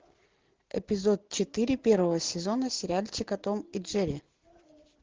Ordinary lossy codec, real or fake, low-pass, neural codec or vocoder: Opus, 16 kbps; real; 7.2 kHz; none